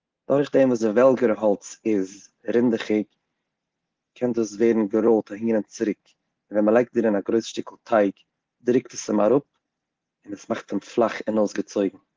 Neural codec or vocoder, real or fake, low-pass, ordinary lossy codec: none; real; 7.2 kHz; Opus, 16 kbps